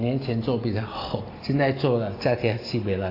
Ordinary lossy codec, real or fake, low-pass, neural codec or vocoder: AAC, 24 kbps; real; 5.4 kHz; none